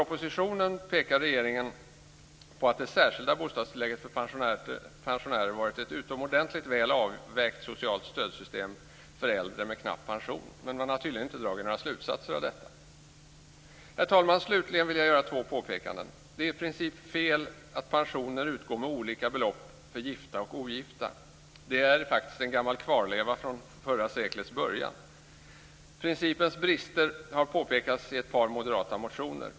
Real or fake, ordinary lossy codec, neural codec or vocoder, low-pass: real; none; none; none